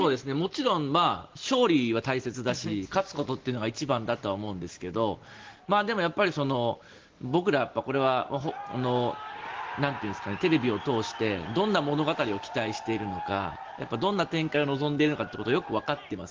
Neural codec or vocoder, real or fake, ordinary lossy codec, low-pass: none; real; Opus, 16 kbps; 7.2 kHz